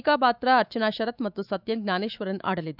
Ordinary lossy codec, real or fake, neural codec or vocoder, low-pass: none; fake; autoencoder, 48 kHz, 128 numbers a frame, DAC-VAE, trained on Japanese speech; 5.4 kHz